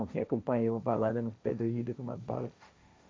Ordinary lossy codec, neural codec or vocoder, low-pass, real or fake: none; codec, 16 kHz, 1.1 kbps, Voila-Tokenizer; none; fake